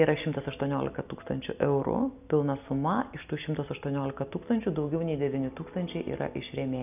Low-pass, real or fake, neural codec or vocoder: 3.6 kHz; real; none